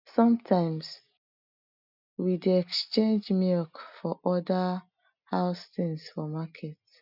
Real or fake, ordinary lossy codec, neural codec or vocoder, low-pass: real; none; none; 5.4 kHz